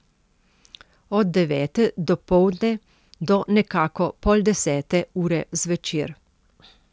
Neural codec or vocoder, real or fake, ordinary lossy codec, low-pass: none; real; none; none